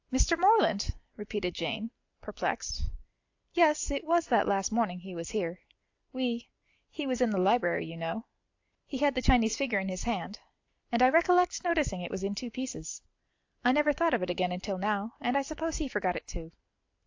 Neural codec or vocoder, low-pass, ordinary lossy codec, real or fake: none; 7.2 kHz; AAC, 48 kbps; real